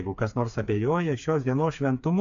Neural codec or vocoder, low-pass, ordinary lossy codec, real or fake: codec, 16 kHz, 4 kbps, FreqCodec, smaller model; 7.2 kHz; AAC, 64 kbps; fake